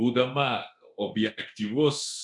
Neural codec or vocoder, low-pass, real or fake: codec, 24 kHz, 0.9 kbps, DualCodec; 10.8 kHz; fake